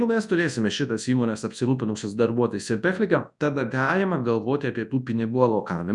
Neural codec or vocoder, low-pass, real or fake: codec, 24 kHz, 0.9 kbps, WavTokenizer, large speech release; 10.8 kHz; fake